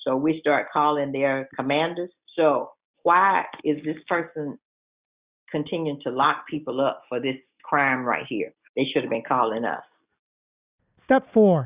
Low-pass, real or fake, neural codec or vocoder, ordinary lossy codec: 3.6 kHz; real; none; Opus, 64 kbps